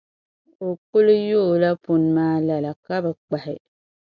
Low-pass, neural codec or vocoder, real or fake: 7.2 kHz; none; real